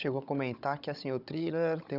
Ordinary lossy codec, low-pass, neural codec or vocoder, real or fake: none; 5.4 kHz; codec, 16 kHz, 16 kbps, FunCodec, trained on Chinese and English, 50 frames a second; fake